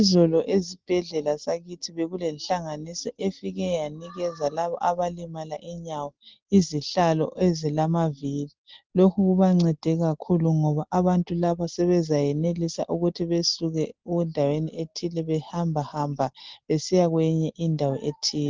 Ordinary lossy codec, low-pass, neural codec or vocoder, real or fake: Opus, 16 kbps; 7.2 kHz; none; real